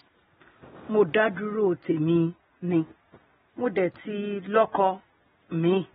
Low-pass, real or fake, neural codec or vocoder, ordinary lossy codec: 10.8 kHz; real; none; AAC, 16 kbps